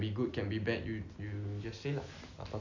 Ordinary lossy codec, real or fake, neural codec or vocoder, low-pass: none; real; none; 7.2 kHz